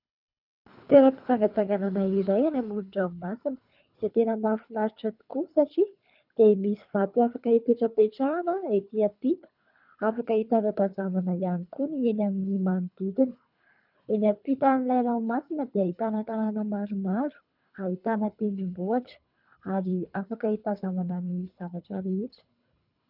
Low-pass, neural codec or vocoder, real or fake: 5.4 kHz; codec, 24 kHz, 3 kbps, HILCodec; fake